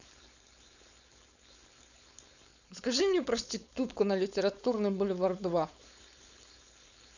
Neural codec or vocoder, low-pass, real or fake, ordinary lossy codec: codec, 16 kHz, 4.8 kbps, FACodec; 7.2 kHz; fake; none